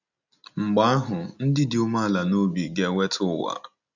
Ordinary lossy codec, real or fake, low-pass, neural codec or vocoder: none; real; 7.2 kHz; none